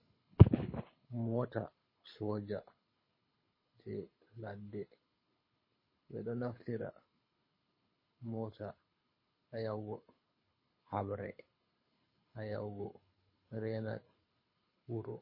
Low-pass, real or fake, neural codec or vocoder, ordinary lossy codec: 5.4 kHz; fake; codec, 24 kHz, 6 kbps, HILCodec; MP3, 24 kbps